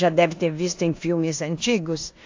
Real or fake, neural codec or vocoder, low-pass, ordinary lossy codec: fake; codec, 16 kHz in and 24 kHz out, 0.9 kbps, LongCat-Audio-Codec, four codebook decoder; 7.2 kHz; AAC, 48 kbps